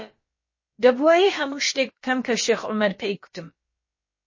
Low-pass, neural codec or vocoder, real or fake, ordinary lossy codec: 7.2 kHz; codec, 16 kHz, about 1 kbps, DyCAST, with the encoder's durations; fake; MP3, 32 kbps